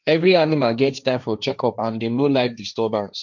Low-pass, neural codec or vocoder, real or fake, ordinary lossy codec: 7.2 kHz; codec, 16 kHz, 1.1 kbps, Voila-Tokenizer; fake; none